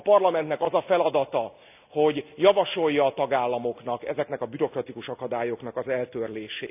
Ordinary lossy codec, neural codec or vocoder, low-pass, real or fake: none; none; 3.6 kHz; real